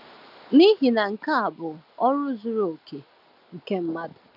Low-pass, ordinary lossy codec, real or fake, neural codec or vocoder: 5.4 kHz; none; real; none